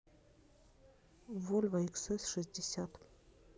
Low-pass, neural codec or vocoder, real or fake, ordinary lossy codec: none; none; real; none